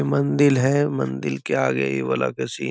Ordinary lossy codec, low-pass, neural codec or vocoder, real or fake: none; none; none; real